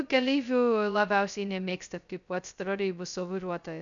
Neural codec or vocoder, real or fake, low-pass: codec, 16 kHz, 0.2 kbps, FocalCodec; fake; 7.2 kHz